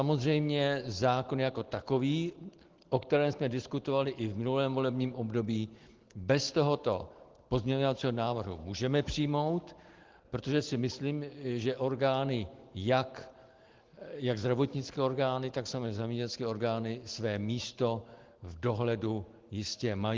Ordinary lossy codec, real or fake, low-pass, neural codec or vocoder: Opus, 16 kbps; real; 7.2 kHz; none